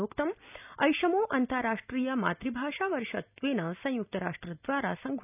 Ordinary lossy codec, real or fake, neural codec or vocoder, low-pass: none; real; none; 3.6 kHz